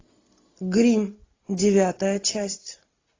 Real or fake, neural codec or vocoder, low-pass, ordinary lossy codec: real; none; 7.2 kHz; AAC, 32 kbps